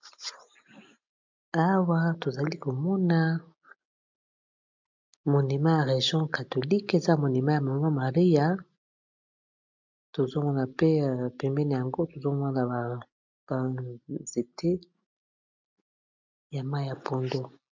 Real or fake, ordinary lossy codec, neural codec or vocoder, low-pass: real; MP3, 64 kbps; none; 7.2 kHz